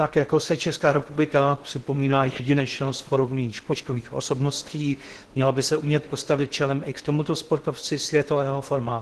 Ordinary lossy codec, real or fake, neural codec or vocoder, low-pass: Opus, 16 kbps; fake; codec, 16 kHz in and 24 kHz out, 0.6 kbps, FocalCodec, streaming, 2048 codes; 10.8 kHz